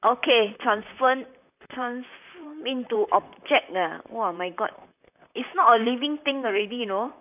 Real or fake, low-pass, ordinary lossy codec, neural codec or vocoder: fake; 3.6 kHz; none; vocoder, 44.1 kHz, 128 mel bands, Pupu-Vocoder